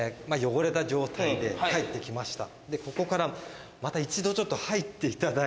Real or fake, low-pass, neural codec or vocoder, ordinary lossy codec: real; none; none; none